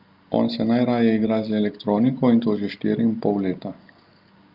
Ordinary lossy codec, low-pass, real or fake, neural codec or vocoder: Opus, 32 kbps; 5.4 kHz; real; none